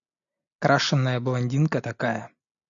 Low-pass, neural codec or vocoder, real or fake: 7.2 kHz; none; real